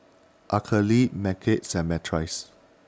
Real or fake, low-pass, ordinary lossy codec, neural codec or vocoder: real; none; none; none